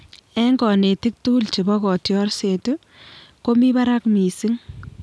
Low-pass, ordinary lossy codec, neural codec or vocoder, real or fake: none; none; none; real